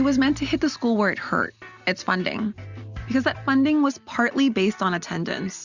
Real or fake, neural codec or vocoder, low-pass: real; none; 7.2 kHz